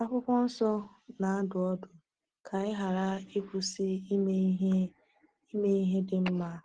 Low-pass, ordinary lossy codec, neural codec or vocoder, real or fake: 9.9 kHz; Opus, 16 kbps; none; real